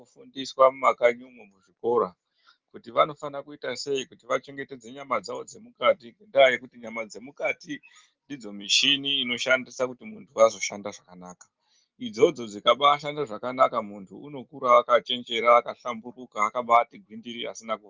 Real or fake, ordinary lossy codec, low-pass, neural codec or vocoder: real; Opus, 32 kbps; 7.2 kHz; none